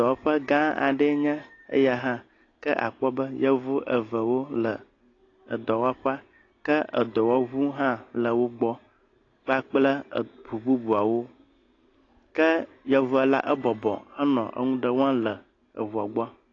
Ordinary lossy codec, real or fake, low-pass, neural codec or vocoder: AAC, 32 kbps; real; 7.2 kHz; none